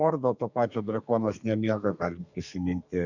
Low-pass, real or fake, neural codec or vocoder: 7.2 kHz; fake; codec, 32 kHz, 1.9 kbps, SNAC